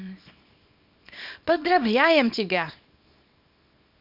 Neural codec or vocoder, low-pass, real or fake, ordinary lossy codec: codec, 24 kHz, 0.9 kbps, WavTokenizer, small release; 5.4 kHz; fake; none